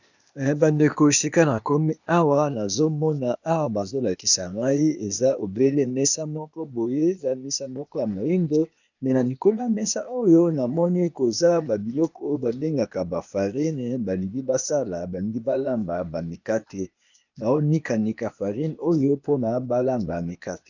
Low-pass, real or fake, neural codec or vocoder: 7.2 kHz; fake; codec, 16 kHz, 0.8 kbps, ZipCodec